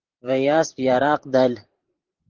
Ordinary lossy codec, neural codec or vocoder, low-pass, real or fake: Opus, 16 kbps; vocoder, 44.1 kHz, 128 mel bands every 512 samples, BigVGAN v2; 7.2 kHz; fake